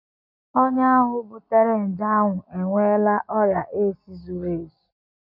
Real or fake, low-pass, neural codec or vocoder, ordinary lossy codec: real; 5.4 kHz; none; none